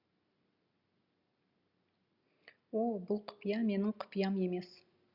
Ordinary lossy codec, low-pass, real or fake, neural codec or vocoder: Opus, 64 kbps; 5.4 kHz; real; none